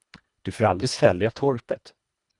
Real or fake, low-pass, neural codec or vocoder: fake; 10.8 kHz; codec, 24 kHz, 1.5 kbps, HILCodec